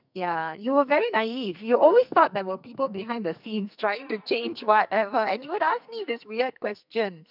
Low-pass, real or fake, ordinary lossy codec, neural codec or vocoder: 5.4 kHz; fake; none; codec, 44.1 kHz, 2.6 kbps, SNAC